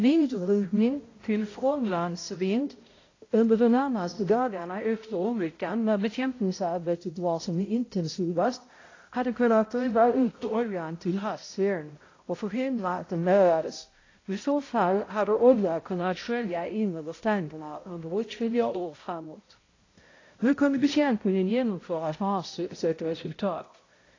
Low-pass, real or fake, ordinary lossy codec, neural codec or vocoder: 7.2 kHz; fake; AAC, 32 kbps; codec, 16 kHz, 0.5 kbps, X-Codec, HuBERT features, trained on balanced general audio